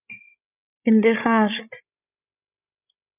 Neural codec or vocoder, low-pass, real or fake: codec, 16 kHz, 16 kbps, FreqCodec, larger model; 3.6 kHz; fake